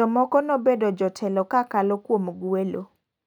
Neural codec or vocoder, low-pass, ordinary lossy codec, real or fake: none; 19.8 kHz; none; real